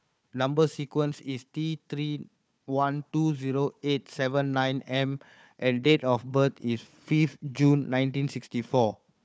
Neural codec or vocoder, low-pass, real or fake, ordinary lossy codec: codec, 16 kHz, 4 kbps, FunCodec, trained on Chinese and English, 50 frames a second; none; fake; none